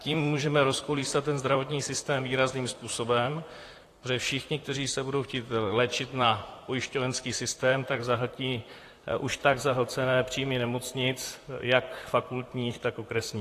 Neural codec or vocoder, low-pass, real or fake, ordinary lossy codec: vocoder, 44.1 kHz, 128 mel bands, Pupu-Vocoder; 14.4 kHz; fake; AAC, 48 kbps